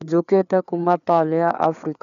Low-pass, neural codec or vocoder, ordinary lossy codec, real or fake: 7.2 kHz; codec, 16 kHz, 4 kbps, FreqCodec, larger model; none; fake